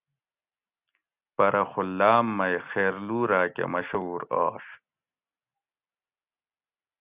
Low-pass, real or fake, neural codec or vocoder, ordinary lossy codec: 3.6 kHz; real; none; Opus, 64 kbps